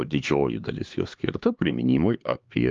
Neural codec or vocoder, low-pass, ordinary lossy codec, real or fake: codec, 16 kHz, 2 kbps, X-Codec, HuBERT features, trained on LibriSpeech; 7.2 kHz; Opus, 32 kbps; fake